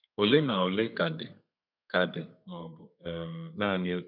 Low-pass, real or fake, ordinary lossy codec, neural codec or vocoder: 5.4 kHz; fake; none; codec, 32 kHz, 1.9 kbps, SNAC